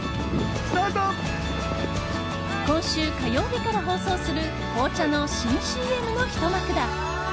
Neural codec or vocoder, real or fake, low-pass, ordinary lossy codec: none; real; none; none